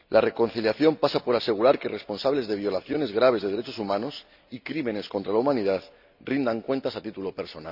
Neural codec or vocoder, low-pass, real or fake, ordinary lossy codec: none; 5.4 kHz; real; Opus, 64 kbps